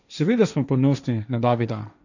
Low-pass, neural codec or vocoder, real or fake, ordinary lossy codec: 7.2 kHz; codec, 16 kHz, 1.1 kbps, Voila-Tokenizer; fake; none